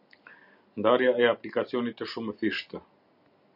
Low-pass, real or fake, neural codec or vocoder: 5.4 kHz; real; none